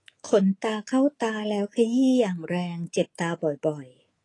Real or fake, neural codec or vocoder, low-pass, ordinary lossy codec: fake; codec, 24 kHz, 3.1 kbps, DualCodec; 10.8 kHz; AAC, 32 kbps